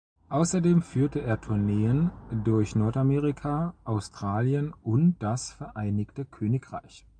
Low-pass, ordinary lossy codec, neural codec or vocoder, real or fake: 9.9 kHz; MP3, 96 kbps; none; real